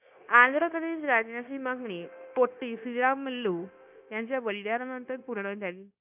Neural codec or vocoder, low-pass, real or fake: codec, 16 kHz, 0.9 kbps, LongCat-Audio-Codec; 3.6 kHz; fake